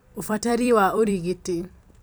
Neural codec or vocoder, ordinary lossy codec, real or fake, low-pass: vocoder, 44.1 kHz, 128 mel bands every 256 samples, BigVGAN v2; none; fake; none